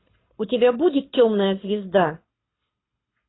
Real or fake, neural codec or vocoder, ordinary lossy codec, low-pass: fake; codec, 24 kHz, 6 kbps, HILCodec; AAC, 16 kbps; 7.2 kHz